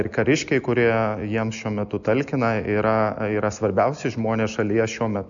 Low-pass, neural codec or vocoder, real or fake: 7.2 kHz; none; real